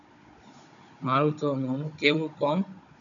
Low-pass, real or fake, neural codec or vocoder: 7.2 kHz; fake; codec, 16 kHz, 16 kbps, FunCodec, trained on Chinese and English, 50 frames a second